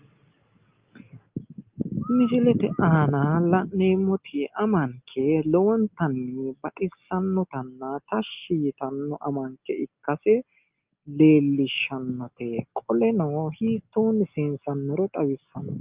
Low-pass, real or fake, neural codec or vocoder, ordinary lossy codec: 3.6 kHz; real; none; Opus, 24 kbps